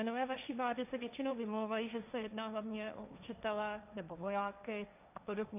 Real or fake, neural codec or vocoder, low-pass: fake; codec, 16 kHz, 1.1 kbps, Voila-Tokenizer; 3.6 kHz